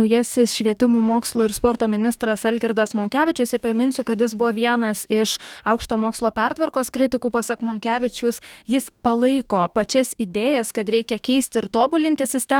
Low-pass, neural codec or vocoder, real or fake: 19.8 kHz; codec, 44.1 kHz, 2.6 kbps, DAC; fake